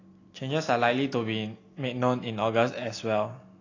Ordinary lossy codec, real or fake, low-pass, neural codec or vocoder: AAC, 32 kbps; real; 7.2 kHz; none